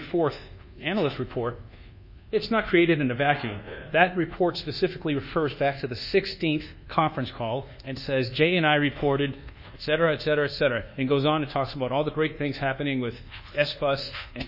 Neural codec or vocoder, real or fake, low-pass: codec, 24 kHz, 1.2 kbps, DualCodec; fake; 5.4 kHz